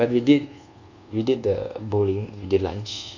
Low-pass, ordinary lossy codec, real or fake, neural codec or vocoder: 7.2 kHz; none; fake; codec, 24 kHz, 1.2 kbps, DualCodec